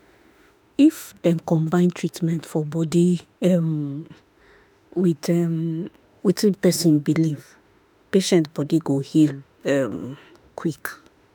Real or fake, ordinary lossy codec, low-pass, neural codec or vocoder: fake; none; none; autoencoder, 48 kHz, 32 numbers a frame, DAC-VAE, trained on Japanese speech